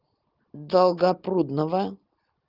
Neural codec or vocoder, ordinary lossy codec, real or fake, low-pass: none; Opus, 32 kbps; real; 5.4 kHz